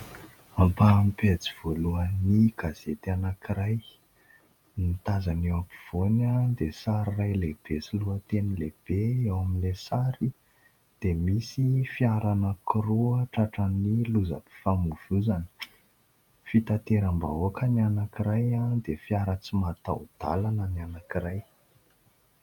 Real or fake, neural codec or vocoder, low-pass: fake; vocoder, 48 kHz, 128 mel bands, Vocos; 19.8 kHz